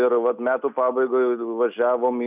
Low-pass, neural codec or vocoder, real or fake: 3.6 kHz; none; real